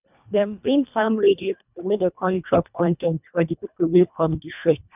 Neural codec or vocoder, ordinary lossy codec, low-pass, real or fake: codec, 24 kHz, 1.5 kbps, HILCodec; none; 3.6 kHz; fake